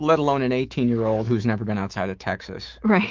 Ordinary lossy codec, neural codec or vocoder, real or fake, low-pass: Opus, 24 kbps; autoencoder, 48 kHz, 128 numbers a frame, DAC-VAE, trained on Japanese speech; fake; 7.2 kHz